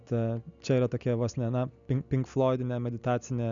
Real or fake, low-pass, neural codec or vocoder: real; 7.2 kHz; none